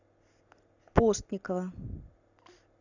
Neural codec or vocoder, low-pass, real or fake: none; 7.2 kHz; real